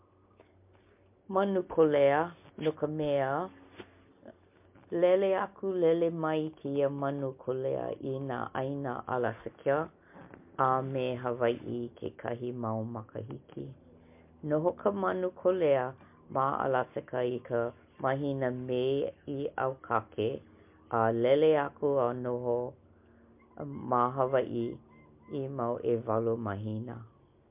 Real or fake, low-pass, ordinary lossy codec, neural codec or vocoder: real; 3.6 kHz; MP3, 32 kbps; none